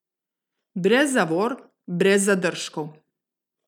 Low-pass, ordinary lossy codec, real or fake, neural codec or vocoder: 19.8 kHz; none; real; none